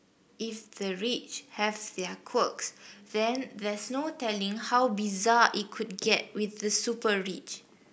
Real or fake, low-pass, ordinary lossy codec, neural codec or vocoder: real; none; none; none